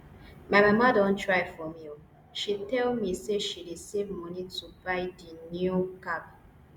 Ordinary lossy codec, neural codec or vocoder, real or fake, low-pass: Opus, 64 kbps; none; real; 19.8 kHz